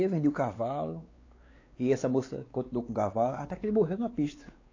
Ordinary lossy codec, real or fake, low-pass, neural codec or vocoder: AAC, 32 kbps; fake; 7.2 kHz; codec, 16 kHz, 4 kbps, X-Codec, WavLM features, trained on Multilingual LibriSpeech